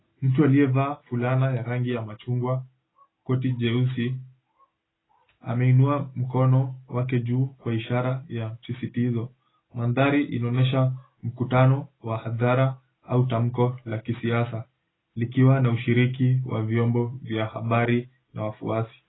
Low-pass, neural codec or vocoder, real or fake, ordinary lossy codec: 7.2 kHz; none; real; AAC, 16 kbps